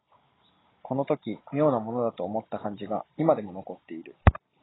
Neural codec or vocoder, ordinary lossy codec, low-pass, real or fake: none; AAC, 16 kbps; 7.2 kHz; real